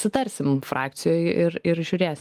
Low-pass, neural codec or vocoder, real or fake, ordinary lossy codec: 14.4 kHz; none; real; Opus, 32 kbps